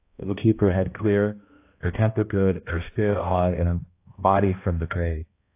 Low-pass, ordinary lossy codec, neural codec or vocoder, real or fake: 3.6 kHz; AAC, 24 kbps; codec, 16 kHz, 1 kbps, X-Codec, HuBERT features, trained on balanced general audio; fake